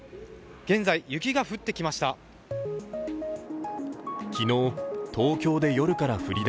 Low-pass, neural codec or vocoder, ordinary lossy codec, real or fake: none; none; none; real